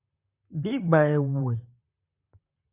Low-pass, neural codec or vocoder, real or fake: 3.6 kHz; vocoder, 44.1 kHz, 128 mel bands, Pupu-Vocoder; fake